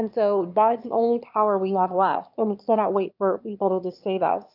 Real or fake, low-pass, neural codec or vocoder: fake; 5.4 kHz; autoencoder, 22.05 kHz, a latent of 192 numbers a frame, VITS, trained on one speaker